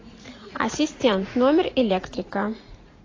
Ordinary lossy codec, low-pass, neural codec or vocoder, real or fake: AAC, 32 kbps; 7.2 kHz; vocoder, 44.1 kHz, 80 mel bands, Vocos; fake